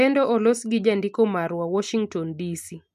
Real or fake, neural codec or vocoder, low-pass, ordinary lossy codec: real; none; 14.4 kHz; none